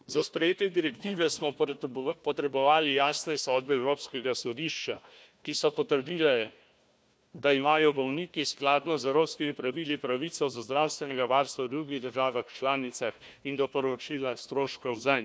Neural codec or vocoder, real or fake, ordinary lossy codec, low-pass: codec, 16 kHz, 1 kbps, FunCodec, trained on Chinese and English, 50 frames a second; fake; none; none